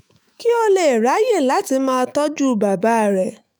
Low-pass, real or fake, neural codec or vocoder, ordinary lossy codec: none; fake; autoencoder, 48 kHz, 128 numbers a frame, DAC-VAE, trained on Japanese speech; none